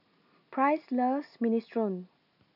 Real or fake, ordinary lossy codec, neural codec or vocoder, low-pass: real; none; none; 5.4 kHz